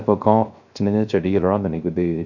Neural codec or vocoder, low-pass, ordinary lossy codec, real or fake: codec, 16 kHz, 0.3 kbps, FocalCodec; 7.2 kHz; none; fake